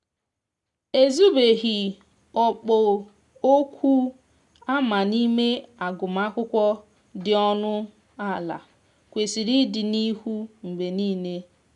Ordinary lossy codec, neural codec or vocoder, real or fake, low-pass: none; none; real; 10.8 kHz